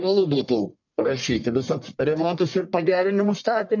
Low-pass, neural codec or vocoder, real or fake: 7.2 kHz; codec, 44.1 kHz, 1.7 kbps, Pupu-Codec; fake